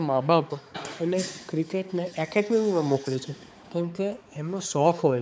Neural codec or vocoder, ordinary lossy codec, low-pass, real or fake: codec, 16 kHz, 4 kbps, X-Codec, HuBERT features, trained on balanced general audio; none; none; fake